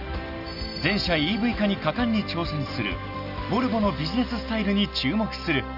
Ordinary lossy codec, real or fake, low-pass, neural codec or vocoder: none; real; 5.4 kHz; none